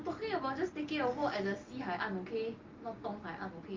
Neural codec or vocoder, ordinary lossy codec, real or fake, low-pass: none; Opus, 32 kbps; real; 7.2 kHz